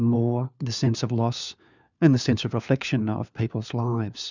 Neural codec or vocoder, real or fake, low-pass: codec, 16 kHz, 4 kbps, FunCodec, trained on LibriTTS, 50 frames a second; fake; 7.2 kHz